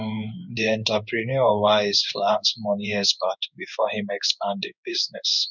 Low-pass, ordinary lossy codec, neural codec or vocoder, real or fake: 7.2 kHz; none; codec, 16 kHz in and 24 kHz out, 1 kbps, XY-Tokenizer; fake